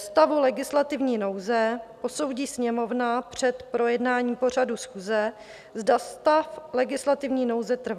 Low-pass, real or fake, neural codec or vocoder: 14.4 kHz; real; none